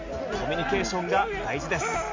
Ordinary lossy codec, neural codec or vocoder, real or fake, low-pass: MP3, 64 kbps; none; real; 7.2 kHz